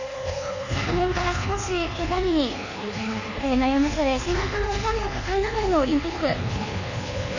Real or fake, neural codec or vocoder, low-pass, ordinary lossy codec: fake; codec, 24 kHz, 1.2 kbps, DualCodec; 7.2 kHz; none